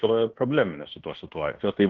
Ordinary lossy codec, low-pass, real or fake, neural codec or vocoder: Opus, 16 kbps; 7.2 kHz; fake; codec, 16 kHz in and 24 kHz out, 0.9 kbps, LongCat-Audio-Codec, fine tuned four codebook decoder